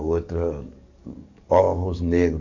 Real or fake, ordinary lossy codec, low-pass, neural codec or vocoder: fake; none; 7.2 kHz; codec, 44.1 kHz, 7.8 kbps, DAC